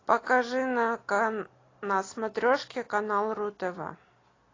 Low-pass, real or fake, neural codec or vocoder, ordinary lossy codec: 7.2 kHz; real; none; AAC, 32 kbps